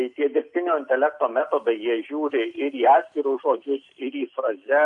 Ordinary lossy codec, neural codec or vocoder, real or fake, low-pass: AAC, 64 kbps; none; real; 10.8 kHz